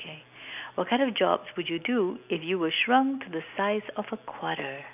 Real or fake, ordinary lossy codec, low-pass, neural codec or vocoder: real; none; 3.6 kHz; none